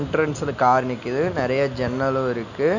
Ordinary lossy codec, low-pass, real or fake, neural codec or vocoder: none; 7.2 kHz; real; none